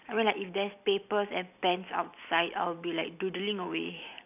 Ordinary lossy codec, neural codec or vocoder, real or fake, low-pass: none; none; real; 3.6 kHz